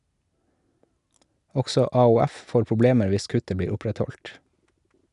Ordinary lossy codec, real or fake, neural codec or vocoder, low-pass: none; real; none; 10.8 kHz